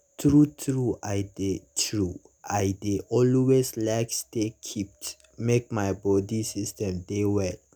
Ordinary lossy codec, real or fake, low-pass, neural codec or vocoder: none; real; none; none